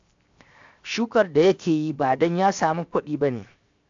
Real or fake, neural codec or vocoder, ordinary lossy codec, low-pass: fake; codec, 16 kHz, 0.7 kbps, FocalCodec; AAC, 64 kbps; 7.2 kHz